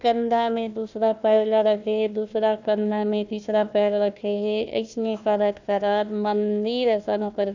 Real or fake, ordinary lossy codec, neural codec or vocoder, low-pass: fake; none; codec, 16 kHz, 1 kbps, FunCodec, trained on Chinese and English, 50 frames a second; 7.2 kHz